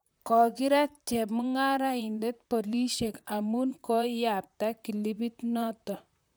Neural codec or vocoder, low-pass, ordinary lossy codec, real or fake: vocoder, 44.1 kHz, 128 mel bands, Pupu-Vocoder; none; none; fake